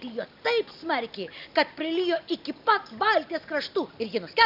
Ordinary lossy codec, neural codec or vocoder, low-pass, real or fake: AAC, 48 kbps; none; 5.4 kHz; real